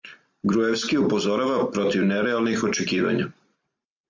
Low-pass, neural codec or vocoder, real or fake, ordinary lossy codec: 7.2 kHz; none; real; MP3, 64 kbps